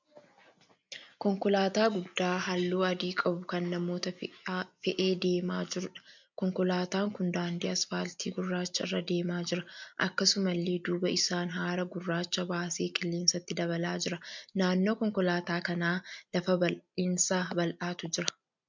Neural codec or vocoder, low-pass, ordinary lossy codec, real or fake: none; 7.2 kHz; MP3, 64 kbps; real